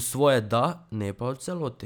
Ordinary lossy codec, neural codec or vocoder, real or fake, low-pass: none; none; real; none